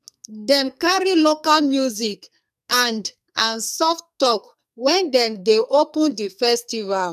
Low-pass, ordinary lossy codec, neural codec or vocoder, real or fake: 14.4 kHz; none; codec, 44.1 kHz, 2.6 kbps, SNAC; fake